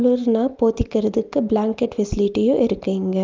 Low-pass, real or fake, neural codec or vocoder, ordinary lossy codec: 7.2 kHz; real; none; Opus, 32 kbps